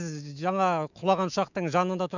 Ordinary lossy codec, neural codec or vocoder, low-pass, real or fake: none; autoencoder, 48 kHz, 128 numbers a frame, DAC-VAE, trained on Japanese speech; 7.2 kHz; fake